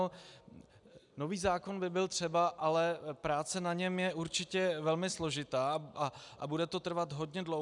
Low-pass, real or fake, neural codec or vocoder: 10.8 kHz; real; none